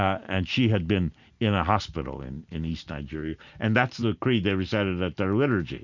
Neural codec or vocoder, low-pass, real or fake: none; 7.2 kHz; real